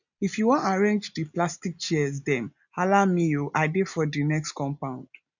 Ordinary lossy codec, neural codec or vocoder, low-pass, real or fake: none; none; 7.2 kHz; real